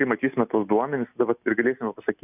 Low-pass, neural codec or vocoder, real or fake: 3.6 kHz; none; real